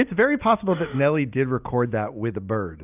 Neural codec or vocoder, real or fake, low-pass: none; real; 3.6 kHz